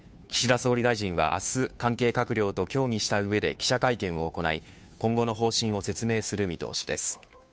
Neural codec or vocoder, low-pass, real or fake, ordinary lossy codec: codec, 16 kHz, 2 kbps, FunCodec, trained on Chinese and English, 25 frames a second; none; fake; none